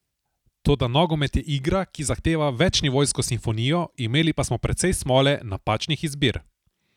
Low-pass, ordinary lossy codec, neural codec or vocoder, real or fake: 19.8 kHz; none; none; real